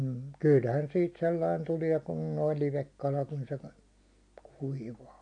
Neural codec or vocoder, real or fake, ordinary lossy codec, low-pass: none; real; AAC, 48 kbps; 9.9 kHz